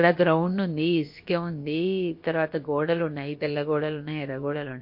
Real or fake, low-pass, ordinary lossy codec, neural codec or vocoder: fake; 5.4 kHz; MP3, 32 kbps; codec, 16 kHz, about 1 kbps, DyCAST, with the encoder's durations